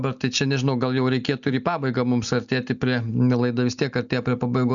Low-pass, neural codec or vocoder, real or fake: 7.2 kHz; none; real